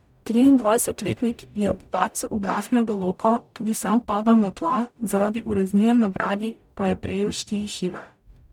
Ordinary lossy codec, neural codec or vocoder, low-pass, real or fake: none; codec, 44.1 kHz, 0.9 kbps, DAC; 19.8 kHz; fake